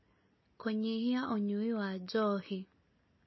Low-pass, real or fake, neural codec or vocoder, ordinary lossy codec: 7.2 kHz; real; none; MP3, 24 kbps